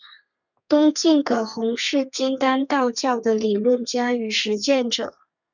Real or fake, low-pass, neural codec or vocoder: fake; 7.2 kHz; codec, 32 kHz, 1.9 kbps, SNAC